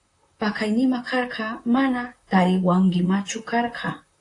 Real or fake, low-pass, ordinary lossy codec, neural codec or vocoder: fake; 10.8 kHz; AAC, 48 kbps; vocoder, 44.1 kHz, 128 mel bands, Pupu-Vocoder